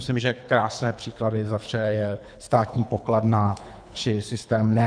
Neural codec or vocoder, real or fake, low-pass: codec, 24 kHz, 3 kbps, HILCodec; fake; 9.9 kHz